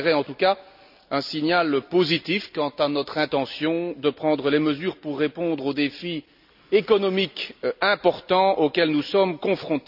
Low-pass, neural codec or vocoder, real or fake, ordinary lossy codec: 5.4 kHz; none; real; none